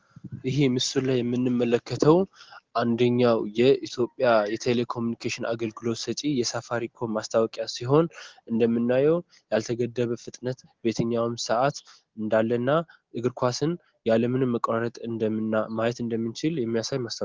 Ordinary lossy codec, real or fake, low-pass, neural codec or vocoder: Opus, 16 kbps; real; 7.2 kHz; none